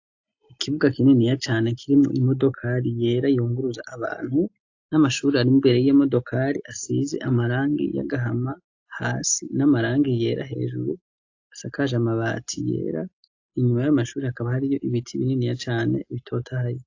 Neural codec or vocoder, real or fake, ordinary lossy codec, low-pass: none; real; AAC, 48 kbps; 7.2 kHz